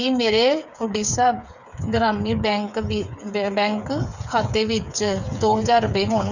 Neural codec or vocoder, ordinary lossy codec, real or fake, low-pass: codec, 16 kHz, 8 kbps, FreqCodec, smaller model; none; fake; 7.2 kHz